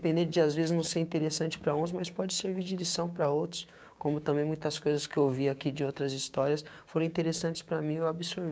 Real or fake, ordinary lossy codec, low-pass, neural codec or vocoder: fake; none; none; codec, 16 kHz, 6 kbps, DAC